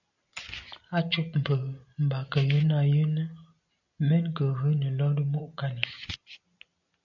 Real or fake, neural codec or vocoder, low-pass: real; none; 7.2 kHz